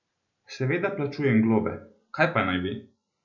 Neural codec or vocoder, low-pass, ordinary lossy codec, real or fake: none; 7.2 kHz; none; real